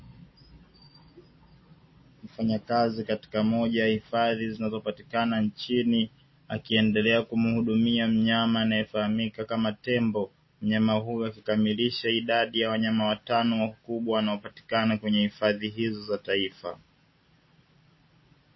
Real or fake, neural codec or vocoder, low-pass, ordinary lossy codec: real; none; 7.2 kHz; MP3, 24 kbps